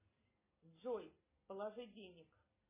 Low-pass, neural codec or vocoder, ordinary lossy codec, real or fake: 3.6 kHz; none; MP3, 16 kbps; real